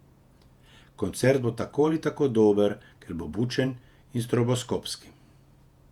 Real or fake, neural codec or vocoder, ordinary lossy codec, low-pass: fake; vocoder, 48 kHz, 128 mel bands, Vocos; Opus, 64 kbps; 19.8 kHz